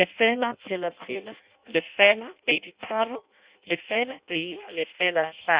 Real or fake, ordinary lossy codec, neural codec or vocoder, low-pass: fake; Opus, 64 kbps; codec, 16 kHz in and 24 kHz out, 0.6 kbps, FireRedTTS-2 codec; 3.6 kHz